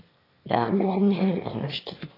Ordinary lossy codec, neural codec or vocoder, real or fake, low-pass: AAC, 24 kbps; autoencoder, 22.05 kHz, a latent of 192 numbers a frame, VITS, trained on one speaker; fake; 5.4 kHz